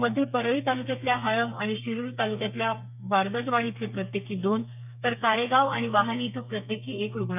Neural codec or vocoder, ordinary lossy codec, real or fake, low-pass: codec, 32 kHz, 1.9 kbps, SNAC; none; fake; 3.6 kHz